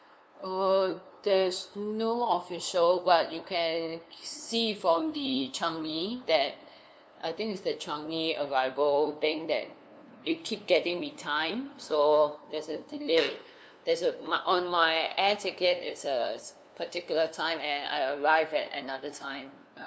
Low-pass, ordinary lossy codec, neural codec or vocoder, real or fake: none; none; codec, 16 kHz, 2 kbps, FunCodec, trained on LibriTTS, 25 frames a second; fake